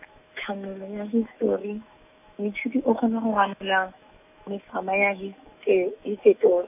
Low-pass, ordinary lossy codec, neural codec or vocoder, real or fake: 3.6 kHz; none; autoencoder, 48 kHz, 128 numbers a frame, DAC-VAE, trained on Japanese speech; fake